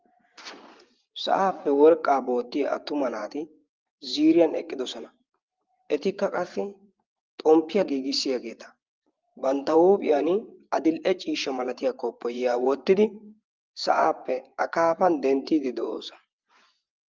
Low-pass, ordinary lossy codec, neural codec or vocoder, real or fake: 7.2 kHz; Opus, 32 kbps; vocoder, 24 kHz, 100 mel bands, Vocos; fake